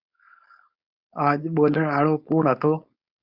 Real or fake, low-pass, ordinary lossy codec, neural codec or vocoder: fake; 5.4 kHz; Opus, 64 kbps; codec, 16 kHz, 4.8 kbps, FACodec